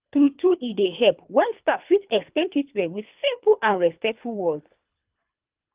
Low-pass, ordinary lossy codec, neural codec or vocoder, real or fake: 3.6 kHz; Opus, 32 kbps; codec, 24 kHz, 3 kbps, HILCodec; fake